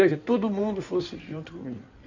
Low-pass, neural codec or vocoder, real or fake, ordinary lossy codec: 7.2 kHz; codec, 16 kHz in and 24 kHz out, 2.2 kbps, FireRedTTS-2 codec; fake; none